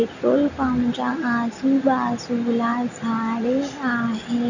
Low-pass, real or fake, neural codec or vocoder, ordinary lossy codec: 7.2 kHz; real; none; none